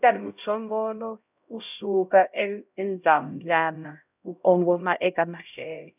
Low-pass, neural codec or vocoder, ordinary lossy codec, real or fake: 3.6 kHz; codec, 16 kHz, 0.5 kbps, X-Codec, HuBERT features, trained on LibriSpeech; none; fake